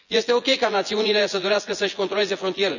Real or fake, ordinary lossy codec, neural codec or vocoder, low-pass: fake; none; vocoder, 24 kHz, 100 mel bands, Vocos; 7.2 kHz